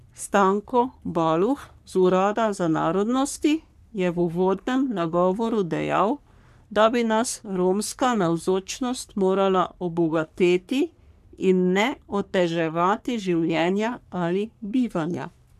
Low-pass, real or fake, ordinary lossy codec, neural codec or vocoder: 14.4 kHz; fake; none; codec, 44.1 kHz, 3.4 kbps, Pupu-Codec